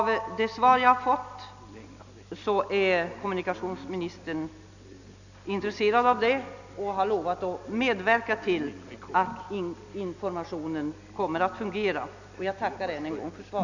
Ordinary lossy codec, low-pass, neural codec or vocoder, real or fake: none; 7.2 kHz; none; real